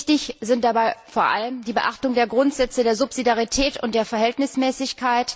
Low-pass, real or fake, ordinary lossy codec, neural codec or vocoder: none; real; none; none